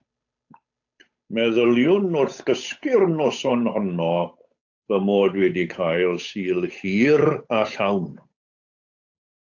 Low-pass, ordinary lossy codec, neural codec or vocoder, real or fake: 7.2 kHz; AAC, 48 kbps; codec, 16 kHz, 8 kbps, FunCodec, trained on Chinese and English, 25 frames a second; fake